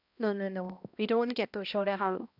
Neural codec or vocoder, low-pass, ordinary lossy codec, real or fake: codec, 16 kHz, 1 kbps, X-Codec, HuBERT features, trained on balanced general audio; 5.4 kHz; none; fake